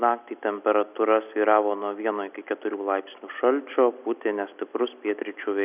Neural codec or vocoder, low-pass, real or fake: none; 3.6 kHz; real